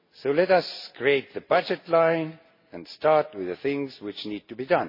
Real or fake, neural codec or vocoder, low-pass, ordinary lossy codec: real; none; 5.4 kHz; MP3, 24 kbps